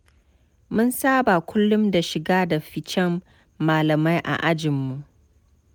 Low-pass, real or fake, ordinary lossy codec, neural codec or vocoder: none; real; none; none